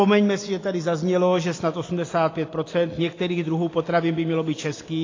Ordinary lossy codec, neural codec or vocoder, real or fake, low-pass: AAC, 32 kbps; none; real; 7.2 kHz